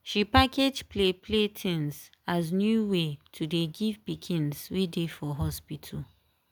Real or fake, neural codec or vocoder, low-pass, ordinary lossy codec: real; none; none; none